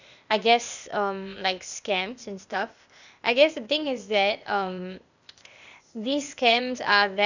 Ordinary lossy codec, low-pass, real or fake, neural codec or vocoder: none; 7.2 kHz; fake; codec, 16 kHz, 0.8 kbps, ZipCodec